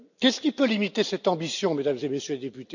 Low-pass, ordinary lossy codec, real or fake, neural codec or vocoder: 7.2 kHz; none; real; none